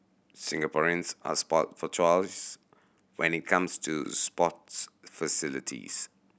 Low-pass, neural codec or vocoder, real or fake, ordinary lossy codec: none; none; real; none